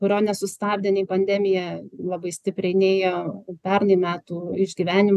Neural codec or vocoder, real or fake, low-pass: none; real; 14.4 kHz